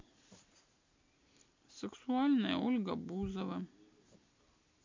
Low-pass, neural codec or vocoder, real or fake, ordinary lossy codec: 7.2 kHz; none; real; MP3, 64 kbps